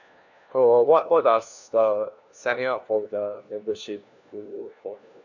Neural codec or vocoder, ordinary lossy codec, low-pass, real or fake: codec, 16 kHz, 1 kbps, FunCodec, trained on LibriTTS, 50 frames a second; none; 7.2 kHz; fake